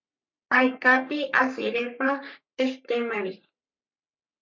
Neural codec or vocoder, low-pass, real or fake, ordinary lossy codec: codec, 44.1 kHz, 3.4 kbps, Pupu-Codec; 7.2 kHz; fake; MP3, 48 kbps